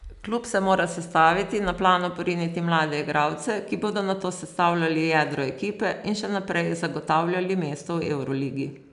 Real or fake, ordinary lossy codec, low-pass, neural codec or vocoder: real; none; 10.8 kHz; none